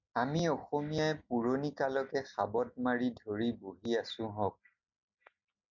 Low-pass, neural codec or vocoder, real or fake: 7.2 kHz; none; real